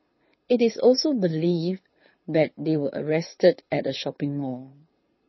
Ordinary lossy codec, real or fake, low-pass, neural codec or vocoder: MP3, 24 kbps; fake; 7.2 kHz; codec, 24 kHz, 6 kbps, HILCodec